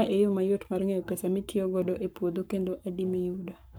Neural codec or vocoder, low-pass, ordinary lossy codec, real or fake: codec, 44.1 kHz, 7.8 kbps, Pupu-Codec; none; none; fake